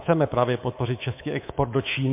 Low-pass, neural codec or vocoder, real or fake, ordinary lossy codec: 3.6 kHz; codec, 24 kHz, 3.1 kbps, DualCodec; fake; MP3, 24 kbps